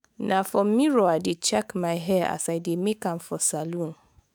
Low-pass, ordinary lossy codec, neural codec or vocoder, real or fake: none; none; autoencoder, 48 kHz, 128 numbers a frame, DAC-VAE, trained on Japanese speech; fake